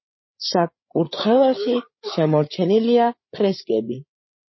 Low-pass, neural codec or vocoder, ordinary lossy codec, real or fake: 7.2 kHz; codec, 16 kHz, 8 kbps, FreqCodec, larger model; MP3, 24 kbps; fake